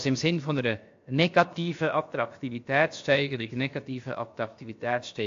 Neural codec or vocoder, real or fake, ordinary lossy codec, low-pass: codec, 16 kHz, about 1 kbps, DyCAST, with the encoder's durations; fake; AAC, 48 kbps; 7.2 kHz